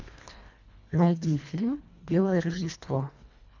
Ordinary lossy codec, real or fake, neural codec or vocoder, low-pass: MP3, 64 kbps; fake; codec, 24 kHz, 1.5 kbps, HILCodec; 7.2 kHz